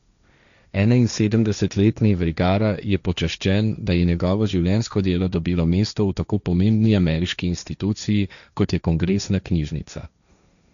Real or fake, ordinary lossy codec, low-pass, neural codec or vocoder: fake; none; 7.2 kHz; codec, 16 kHz, 1.1 kbps, Voila-Tokenizer